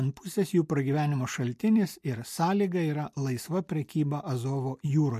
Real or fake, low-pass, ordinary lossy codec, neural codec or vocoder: real; 14.4 kHz; MP3, 64 kbps; none